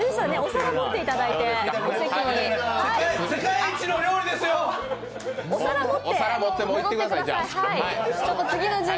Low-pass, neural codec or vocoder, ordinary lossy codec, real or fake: none; none; none; real